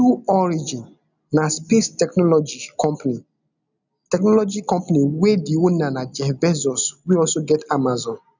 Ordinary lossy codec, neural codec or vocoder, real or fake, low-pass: none; none; real; 7.2 kHz